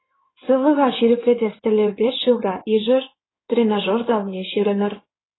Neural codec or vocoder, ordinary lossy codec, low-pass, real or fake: codec, 16 kHz in and 24 kHz out, 2.2 kbps, FireRedTTS-2 codec; AAC, 16 kbps; 7.2 kHz; fake